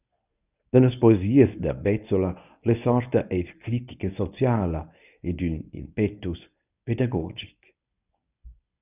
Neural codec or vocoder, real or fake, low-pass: codec, 24 kHz, 0.9 kbps, WavTokenizer, medium speech release version 1; fake; 3.6 kHz